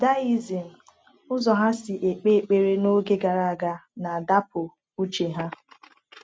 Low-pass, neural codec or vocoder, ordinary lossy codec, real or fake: none; none; none; real